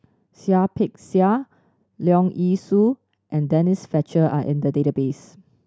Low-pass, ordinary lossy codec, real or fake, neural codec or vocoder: none; none; real; none